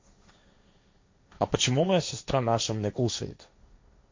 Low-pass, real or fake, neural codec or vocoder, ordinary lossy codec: 7.2 kHz; fake; codec, 16 kHz, 1.1 kbps, Voila-Tokenizer; MP3, 48 kbps